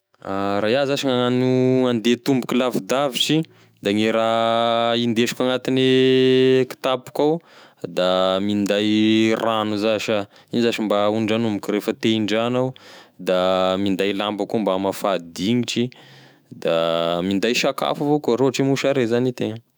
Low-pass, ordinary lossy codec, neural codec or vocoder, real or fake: none; none; autoencoder, 48 kHz, 128 numbers a frame, DAC-VAE, trained on Japanese speech; fake